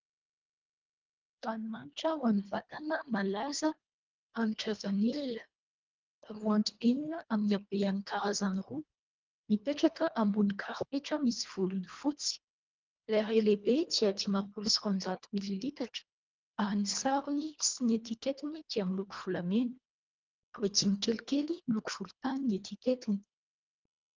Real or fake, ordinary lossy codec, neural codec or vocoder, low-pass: fake; Opus, 32 kbps; codec, 24 kHz, 1.5 kbps, HILCodec; 7.2 kHz